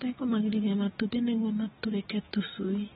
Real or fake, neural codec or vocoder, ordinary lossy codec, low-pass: real; none; AAC, 16 kbps; 19.8 kHz